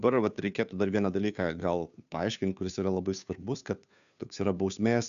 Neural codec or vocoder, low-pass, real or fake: codec, 16 kHz, 2 kbps, FunCodec, trained on Chinese and English, 25 frames a second; 7.2 kHz; fake